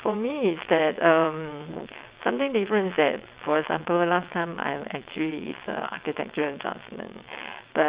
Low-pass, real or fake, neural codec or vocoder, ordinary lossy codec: 3.6 kHz; fake; vocoder, 22.05 kHz, 80 mel bands, WaveNeXt; Opus, 64 kbps